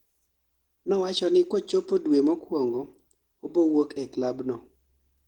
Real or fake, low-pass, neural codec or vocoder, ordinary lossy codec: real; 19.8 kHz; none; Opus, 16 kbps